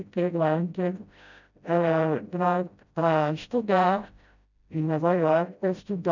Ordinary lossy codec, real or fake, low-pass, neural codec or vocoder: none; fake; 7.2 kHz; codec, 16 kHz, 0.5 kbps, FreqCodec, smaller model